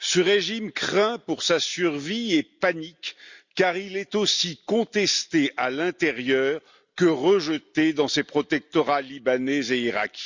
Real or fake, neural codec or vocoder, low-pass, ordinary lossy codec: real; none; 7.2 kHz; Opus, 64 kbps